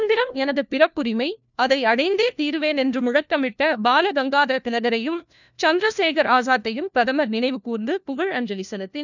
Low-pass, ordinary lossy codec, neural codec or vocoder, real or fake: 7.2 kHz; none; codec, 16 kHz, 1 kbps, FunCodec, trained on LibriTTS, 50 frames a second; fake